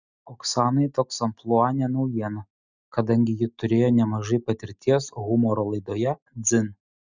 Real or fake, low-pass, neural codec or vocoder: real; 7.2 kHz; none